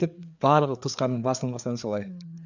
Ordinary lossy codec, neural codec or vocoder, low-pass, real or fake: none; codec, 16 kHz, 4 kbps, FreqCodec, larger model; 7.2 kHz; fake